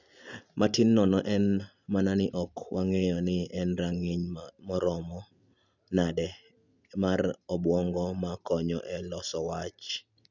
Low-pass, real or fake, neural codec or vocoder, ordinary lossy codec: 7.2 kHz; real; none; none